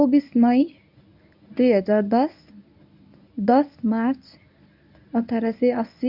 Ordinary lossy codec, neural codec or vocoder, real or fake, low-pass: none; codec, 24 kHz, 0.9 kbps, WavTokenizer, medium speech release version 1; fake; 5.4 kHz